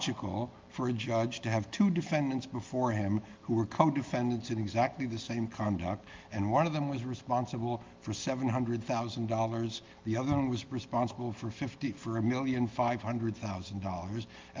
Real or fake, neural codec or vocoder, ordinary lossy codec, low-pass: real; none; Opus, 32 kbps; 7.2 kHz